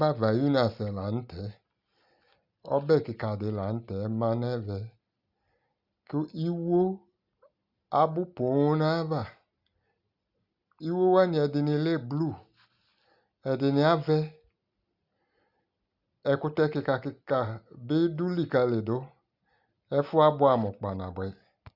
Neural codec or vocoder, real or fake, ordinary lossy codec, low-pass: none; real; Opus, 64 kbps; 5.4 kHz